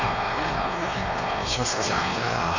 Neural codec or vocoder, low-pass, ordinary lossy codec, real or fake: codec, 16 kHz, 2 kbps, X-Codec, WavLM features, trained on Multilingual LibriSpeech; 7.2 kHz; Opus, 64 kbps; fake